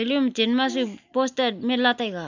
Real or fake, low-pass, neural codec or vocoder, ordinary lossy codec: real; 7.2 kHz; none; none